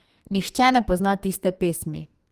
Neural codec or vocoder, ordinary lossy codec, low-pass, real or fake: codec, 44.1 kHz, 2.6 kbps, SNAC; Opus, 32 kbps; 14.4 kHz; fake